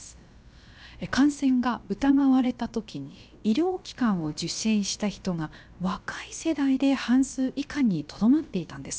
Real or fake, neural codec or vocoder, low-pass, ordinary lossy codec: fake; codec, 16 kHz, about 1 kbps, DyCAST, with the encoder's durations; none; none